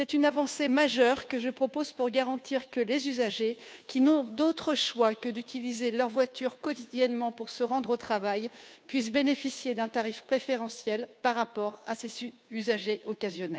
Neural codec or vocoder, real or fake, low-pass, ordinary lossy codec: codec, 16 kHz, 2 kbps, FunCodec, trained on Chinese and English, 25 frames a second; fake; none; none